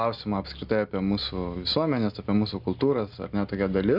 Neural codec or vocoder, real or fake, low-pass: none; real; 5.4 kHz